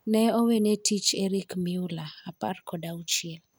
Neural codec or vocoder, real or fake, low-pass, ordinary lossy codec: none; real; none; none